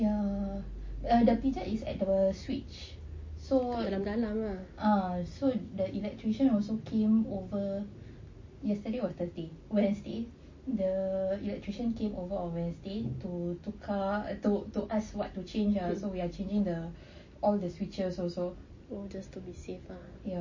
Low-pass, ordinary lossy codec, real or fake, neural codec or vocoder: 7.2 kHz; MP3, 32 kbps; real; none